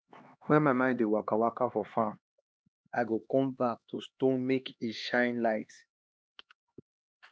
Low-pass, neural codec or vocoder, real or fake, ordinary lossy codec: none; codec, 16 kHz, 2 kbps, X-Codec, HuBERT features, trained on LibriSpeech; fake; none